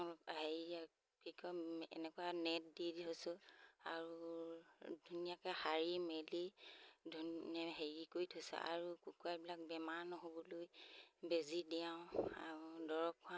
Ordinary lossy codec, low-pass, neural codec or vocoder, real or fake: none; none; none; real